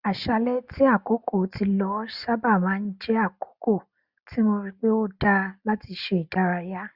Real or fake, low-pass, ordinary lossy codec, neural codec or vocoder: real; 5.4 kHz; Opus, 64 kbps; none